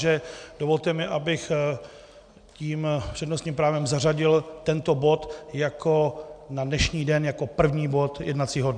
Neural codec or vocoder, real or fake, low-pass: none; real; 9.9 kHz